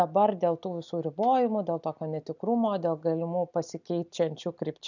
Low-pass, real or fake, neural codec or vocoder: 7.2 kHz; real; none